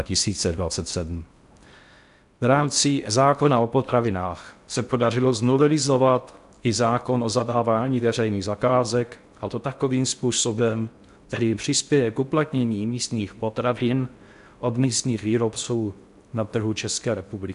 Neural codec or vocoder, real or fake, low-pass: codec, 16 kHz in and 24 kHz out, 0.6 kbps, FocalCodec, streaming, 4096 codes; fake; 10.8 kHz